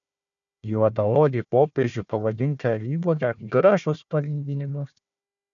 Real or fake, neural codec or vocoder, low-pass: fake; codec, 16 kHz, 1 kbps, FunCodec, trained on Chinese and English, 50 frames a second; 7.2 kHz